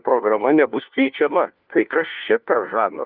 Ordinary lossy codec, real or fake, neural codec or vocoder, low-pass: Opus, 32 kbps; fake; codec, 16 kHz, 1 kbps, FunCodec, trained on LibriTTS, 50 frames a second; 5.4 kHz